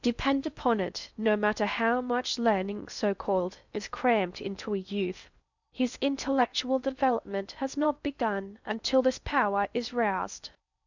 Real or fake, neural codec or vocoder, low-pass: fake; codec, 16 kHz in and 24 kHz out, 0.6 kbps, FocalCodec, streaming, 4096 codes; 7.2 kHz